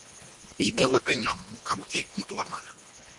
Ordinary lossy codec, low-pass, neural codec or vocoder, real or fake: MP3, 48 kbps; 10.8 kHz; codec, 24 kHz, 1.5 kbps, HILCodec; fake